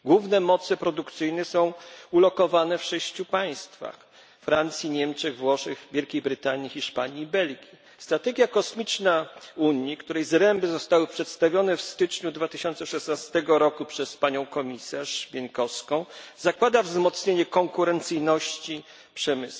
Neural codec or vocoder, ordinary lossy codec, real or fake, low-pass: none; none; real; none